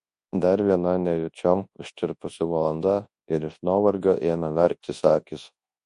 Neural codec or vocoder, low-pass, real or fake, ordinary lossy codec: codec, 24 kHz, 0.9 kbps, WavTokenizer, large speech release; 10.8 kHz; fake; MP3, 48 kbps